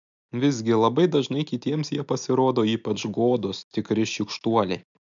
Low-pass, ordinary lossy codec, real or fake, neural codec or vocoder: 7.2 kHz; MP3, 64 kbps; real; none